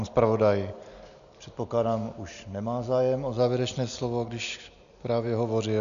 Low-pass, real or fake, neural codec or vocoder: 7.2 kHz; real; none